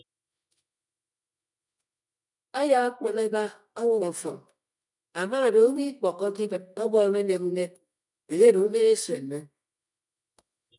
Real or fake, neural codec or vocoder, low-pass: fake; codec, 24 kHz, 0.9 kbps, WavTokenizer, medium music audio release; 10.8 kHz